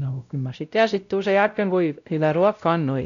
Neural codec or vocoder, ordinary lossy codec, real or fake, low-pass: codec, 16 kHz, 0.5 kbps, X-Codec, WavLM features, trained on Multilingual LibriSpeech; none; fake; 7.2 kHz